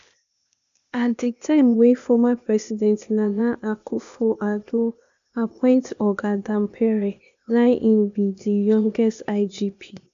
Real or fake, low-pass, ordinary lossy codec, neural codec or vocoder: fake; 7.2 kHz; MP3, 64 kbps; codec, 16 kHz, 0.8 kbps, ZipCodec